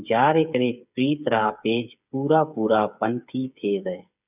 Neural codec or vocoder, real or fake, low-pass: codec, 16 kHz, 8 kbps, FreqCodec, smaller model; fake; 3.6 kHz